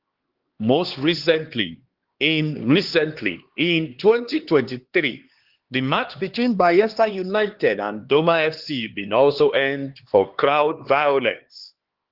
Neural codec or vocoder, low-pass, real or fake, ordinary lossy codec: codec, 16 kHz, 2 kbps, X-Codec, HuBERT features, trained on LibriSpeech; 5.4 kHz; fake; Opus, 16 kbps